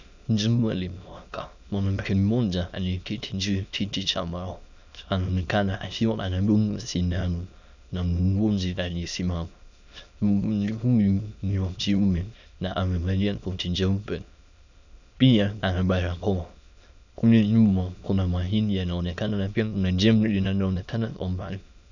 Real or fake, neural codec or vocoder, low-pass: fake; autoencoder, 22.05 kHz, a latent of 192 numbers a frame, VITS, trained on many speakers; 7.2 kHz